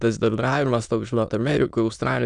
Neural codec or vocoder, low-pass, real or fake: autoencoder, 22.05 kHz, a latent of 192 numbers a frame, VITS, trained on many speakers; 9.9 kHz; fake